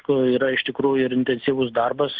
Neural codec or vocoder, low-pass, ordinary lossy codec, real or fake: none; 7.2 kHz; Opus, 24 kbps; real